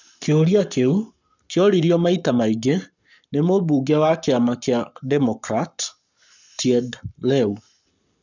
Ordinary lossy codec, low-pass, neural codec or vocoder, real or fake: none; 7.2 kHz; codec, 44.1 kHz, 7.8 kbps, Pupu-Codec; fake